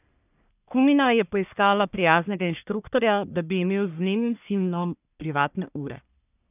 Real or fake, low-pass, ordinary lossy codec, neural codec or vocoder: fake; 3.6 kHz; none; codec, 44.1 kHz, 1.7 kbps, Pupu-Codec